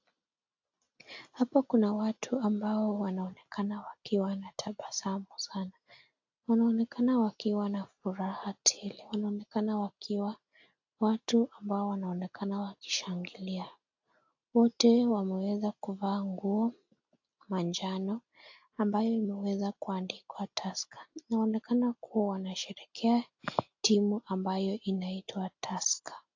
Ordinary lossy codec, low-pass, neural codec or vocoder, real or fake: AAC, 48 kbps; 7.2 kHz; none; real